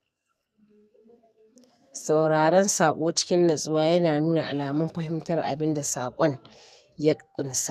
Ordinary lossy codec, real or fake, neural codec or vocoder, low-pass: none; fake; codec, 44.1 kHz, 2.6 kbps, SNAC; 14.4 kHz